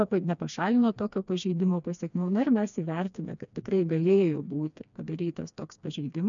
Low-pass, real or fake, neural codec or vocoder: 7.2 kHz; fake; codec, 16 kHz, 2 kbps, FreqCodec, smaller model